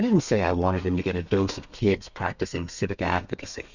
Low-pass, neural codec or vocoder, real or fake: 7.2 kHz; codec, 32 kHz, 1.9 kbps, SNAC; fake